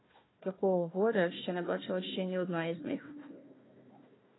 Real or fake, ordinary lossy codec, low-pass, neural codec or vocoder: fake; AAC, 16 kbps; 7.2 kHz; codec, 16 kHz, 1 kbps, FunCodec, trained on Chinese and English, 50 frames a second